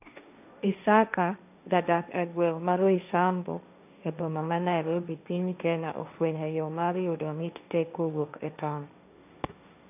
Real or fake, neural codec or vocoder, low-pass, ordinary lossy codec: fake; codec, 16 kHz, 1.1 kbps, Voila-Tokenizer; 3.6 kHz; none